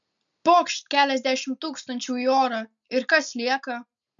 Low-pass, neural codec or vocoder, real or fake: 7.2 kHz; none; real